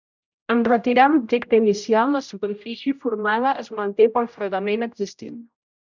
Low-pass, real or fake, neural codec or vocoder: 7.2 kHz; fake; codec, 16 kHz, 0.5 kbps, X-Codec, HuBERT features, trained on general audio